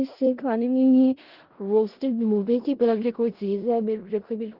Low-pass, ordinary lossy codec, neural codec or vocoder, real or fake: 5.4 kHz; Opus, 16 kbps; codec, 16 kHz in and 24 kHz out, 0.4 kbps, LongCat-Audio-Codec, four codebook decoder; fake